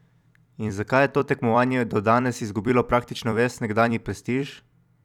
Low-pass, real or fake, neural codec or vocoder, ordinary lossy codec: 19.8 kHz; fake; vocoder, 44.1 kHz, 128 mel bands every 256 samples, BigVGAN v2; none